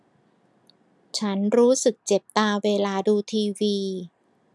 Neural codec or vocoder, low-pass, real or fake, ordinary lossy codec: none; none; real; none